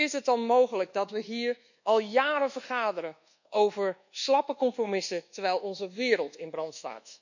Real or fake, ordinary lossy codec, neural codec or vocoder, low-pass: fake; none; codec, 24 kHz, 1.2 kbps, DualCodec; 7.2 kHz